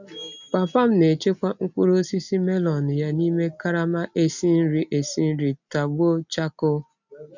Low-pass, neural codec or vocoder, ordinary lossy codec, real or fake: 7.2 kHz; none; none; real